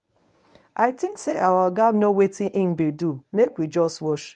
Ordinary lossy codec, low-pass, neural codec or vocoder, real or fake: none; 10.8 kHz; codec, 24 kHz, 0.9 kbps, WavTokenizer, medium speech release version 1; fake